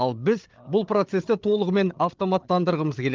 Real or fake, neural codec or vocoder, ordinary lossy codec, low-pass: fake; codec, 16 kHz, 8 kbps, FreqCodec, larger model; Opus, 32 kbps; 7.2 kHz